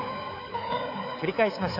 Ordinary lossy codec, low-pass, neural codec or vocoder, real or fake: none; 5.4 kHz; codec, 24 kHz, 3.1 kbps, DualCodec; fake